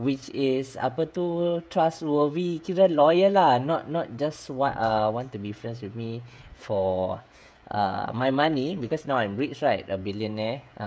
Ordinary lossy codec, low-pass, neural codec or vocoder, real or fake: none; none; codec, 16 kHz, 16 kbps, FreqCodec, smaller model; fake